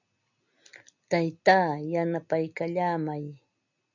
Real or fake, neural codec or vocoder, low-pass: real; none; 7.2 kHz